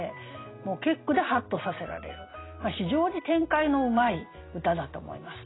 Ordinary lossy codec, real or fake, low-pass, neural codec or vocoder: AAC, 16 kbps; real; 7.2 kHz; none